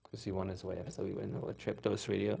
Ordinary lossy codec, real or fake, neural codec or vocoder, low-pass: none; fake; codec, 16 kHz, 0.4 kbps, LongCat-Audio-Codec; none